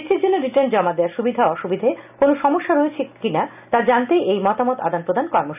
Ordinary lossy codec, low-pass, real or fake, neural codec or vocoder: none; 3.6 kHz; real; none